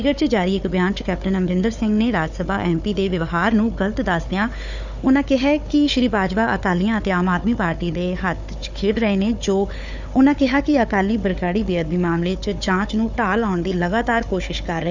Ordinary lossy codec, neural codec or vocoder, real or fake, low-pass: none; codec, 16 kHz, 4 kbps, FunCodec, trained on Chinese and English, 50 frames a second; fake; 7.2 kHz